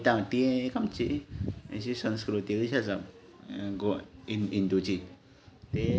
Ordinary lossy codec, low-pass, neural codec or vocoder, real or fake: none; none; none; real